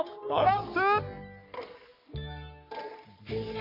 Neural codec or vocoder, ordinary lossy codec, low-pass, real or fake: codec, 44.1 kHz, 3.4 kbps, Pupu-Codec; none; 5.4 kHz; fake